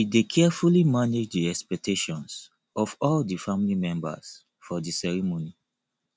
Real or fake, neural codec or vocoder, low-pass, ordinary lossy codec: real; none; none; none